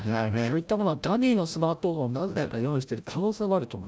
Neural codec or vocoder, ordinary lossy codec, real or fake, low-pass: codec, 16 kHz, 0.5 kbps, FreqCodec, larger model; none; fake; none